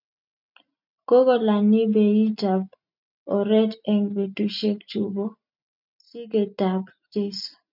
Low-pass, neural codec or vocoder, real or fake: 5.4 kHz; none; real